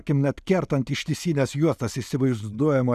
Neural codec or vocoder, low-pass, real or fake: none; 14.4 kHz; real